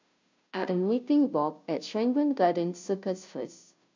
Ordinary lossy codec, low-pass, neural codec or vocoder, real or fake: MP3, 64 kbps; 7.2 kHz; codec, 16 kHz, 0.5 kbps, FunCodec, trained on Chinese and English, 25 frames a second; fake